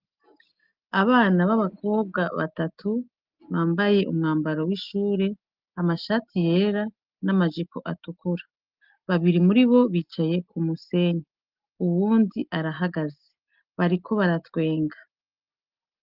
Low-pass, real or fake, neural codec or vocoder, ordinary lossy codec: 5.4 kHz; real; none; Opus, 24 kbps